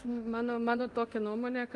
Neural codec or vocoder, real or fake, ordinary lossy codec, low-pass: codec, 24 kHz, 0.9 kbps, DualCodec; fake; Opus, 32 kbps; 10.8 kHz